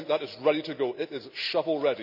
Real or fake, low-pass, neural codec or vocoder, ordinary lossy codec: real; 5.4 kHz; none; none